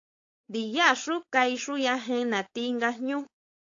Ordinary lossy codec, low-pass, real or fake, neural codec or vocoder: AAC, 48 kbps; 7.2 kHz; fake; codec, 16 kHz, 4.8 kbps, FACodec